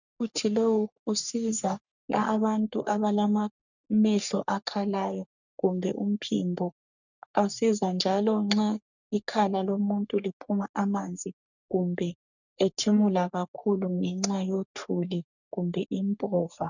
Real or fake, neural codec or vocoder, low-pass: fake; codec, 44.1 kHz, 3.4 kbps, Pupu-Codec; 7.2 kHz